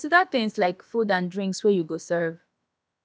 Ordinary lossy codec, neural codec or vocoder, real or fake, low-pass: none; codec, 16 kHz, about 1 kbps, DyCAST, with the encoder's durations; fake; none